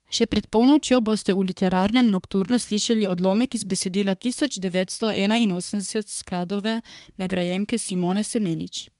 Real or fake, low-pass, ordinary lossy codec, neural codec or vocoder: fake; 10.8 kHz; MP3, 96 kbps; codec, 24 kHz, 1 kbps, SNAC